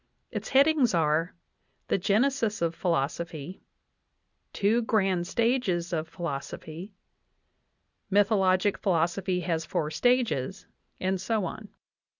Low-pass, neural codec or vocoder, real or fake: 7.2 kHz; none; real